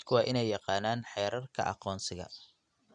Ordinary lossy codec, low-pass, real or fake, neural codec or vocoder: none; 9.9 kHz; real; none